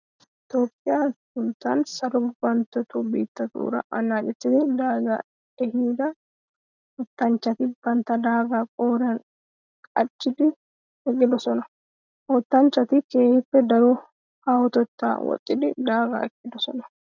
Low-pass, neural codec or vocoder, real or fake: 7.2 kHz; none; real